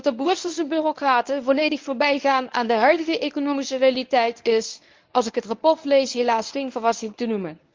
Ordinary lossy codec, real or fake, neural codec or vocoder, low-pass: Opus, 24 kbps; fake; codec, 24 kHz, 0.9 kbps, WavTokenizer, medium speech release version 1; 7.2 kHz